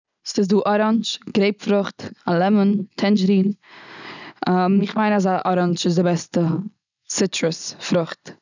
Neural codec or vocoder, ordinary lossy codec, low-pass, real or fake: none; none; 7.2 kHz; real